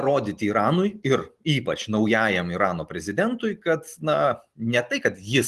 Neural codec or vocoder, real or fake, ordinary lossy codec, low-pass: none; real; Opus, 24 kbps; 14.4 kHz